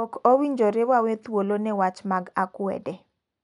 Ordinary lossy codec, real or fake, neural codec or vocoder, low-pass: none; real; none; 10.8 kHz